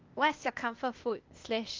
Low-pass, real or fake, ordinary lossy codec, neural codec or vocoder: 7.2 kHz; fake; Opus, 24 kbps; codec, 16 kHz, 0.8 kbps, ZipCodec